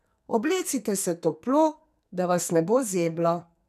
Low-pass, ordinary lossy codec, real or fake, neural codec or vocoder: 14.4 kHz; none; fake; codec, 44.1 kHz, 2.6 kbps, SNAC